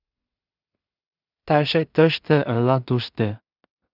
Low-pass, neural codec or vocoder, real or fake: 5.4 kHz; codec, 16 kHz in and 24 kHz out, 0.4 kbps, LongCat-Audio-Codec, two codebook decoder; fake